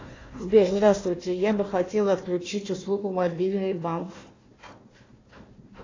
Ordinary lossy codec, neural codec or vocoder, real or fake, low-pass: AAC, 32 kbps; codec, 16 kHz, 1 kbps, FunCodec, trained on Chinese and English, 50 frames a second; fake; 7.2 kHz